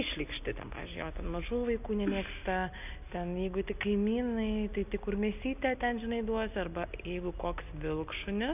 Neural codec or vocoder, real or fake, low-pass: none; real; 3.6 kHz